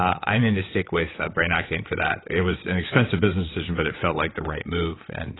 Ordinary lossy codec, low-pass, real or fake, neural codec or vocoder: AAC, 16 kbps; 7.2 kHz; fake; vocoder, 22.05 kHz, 80 mel bands, WaveNeXt